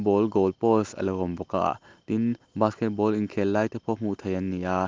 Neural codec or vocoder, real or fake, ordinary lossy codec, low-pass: none; real; Opus, 32 kbps; 7.2 kHz